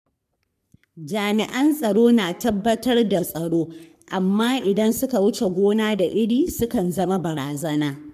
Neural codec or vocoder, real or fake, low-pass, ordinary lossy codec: codec, 44.1 kHz, 3.4 kbps, Pupu-Codec; fake; 14.4 kHz; none